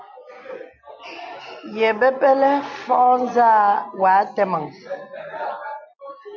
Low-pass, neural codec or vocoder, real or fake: 7.2 kHz; none; real